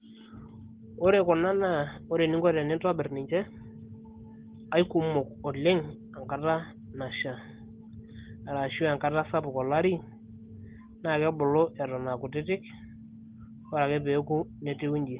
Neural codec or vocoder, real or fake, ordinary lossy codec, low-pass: none; real; Opus, 16 kbps; 3.6 kHz